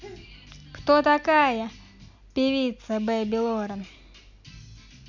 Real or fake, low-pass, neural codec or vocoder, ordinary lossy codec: real; 7.2 kHz; none; none